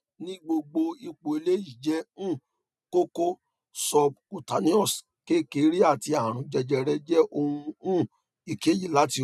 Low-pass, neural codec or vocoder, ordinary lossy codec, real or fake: none; none; none; real